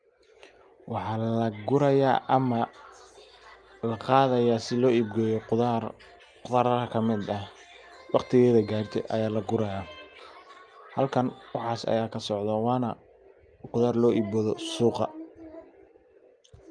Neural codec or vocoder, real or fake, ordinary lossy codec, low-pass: none; real; Opus, 32 kbps; 9.9 kHz